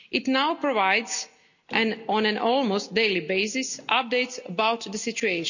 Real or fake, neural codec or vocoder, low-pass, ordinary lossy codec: real; none; 7.2 kHz; none